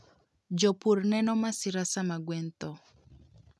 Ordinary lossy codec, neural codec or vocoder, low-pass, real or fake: none; none; none; real